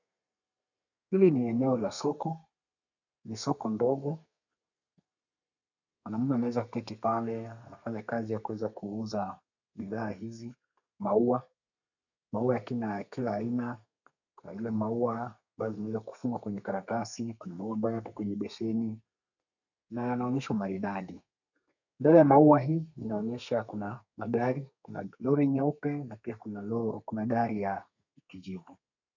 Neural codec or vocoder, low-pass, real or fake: codec, 32 kHz, 1.9 kbps, SNAC; 7.2 kHz; fake